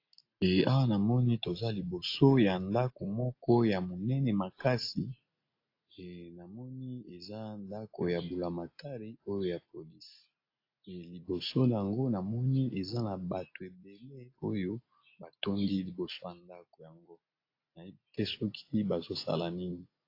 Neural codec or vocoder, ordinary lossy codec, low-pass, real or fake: none; AAC, 32 kbps; 5.4 kHz; real